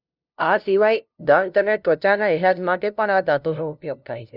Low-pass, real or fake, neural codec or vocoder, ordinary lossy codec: 5.4 kHz; fake; codec, 16 kHz, 0.5 kbps, FunCodec, trained on LibriTTS, 25 frames a second; none